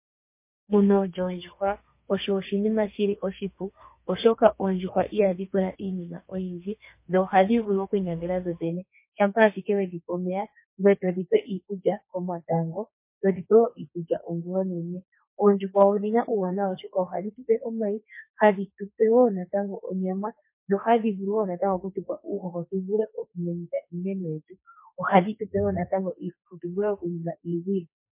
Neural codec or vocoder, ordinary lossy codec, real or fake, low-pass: codec, 32 kHz, 1.9 kbps, SNAC; MP3, 24 kbps; fake; 3.6 kHz